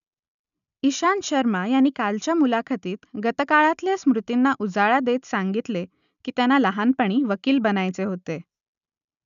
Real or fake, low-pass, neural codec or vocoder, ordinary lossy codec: real; 7.2 kHz; none; none